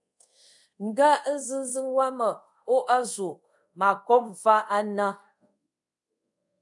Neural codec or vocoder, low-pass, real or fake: codec, 24 kHz, 0.5 kbps, DualCodec; 10.8 kHz; fake